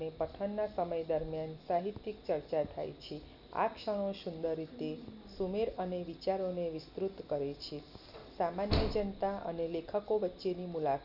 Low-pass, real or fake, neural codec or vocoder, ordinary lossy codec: 5.4 kHz; real; none; none